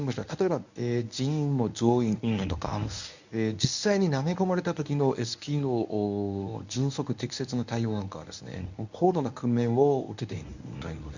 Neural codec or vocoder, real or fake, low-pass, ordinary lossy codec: codec, 24 kHz, 0.9 kbps, WavTokenizer, medium speech release version 1; fake; 7.2 kHz; none